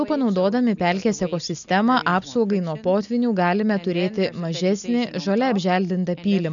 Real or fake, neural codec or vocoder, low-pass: real; none; 7.2 kHz